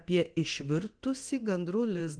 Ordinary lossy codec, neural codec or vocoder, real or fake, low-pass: Opus, 16 kbps; codec, 24 kHz, 0.9 kbps, DualCodec; fake; 9.9 kHz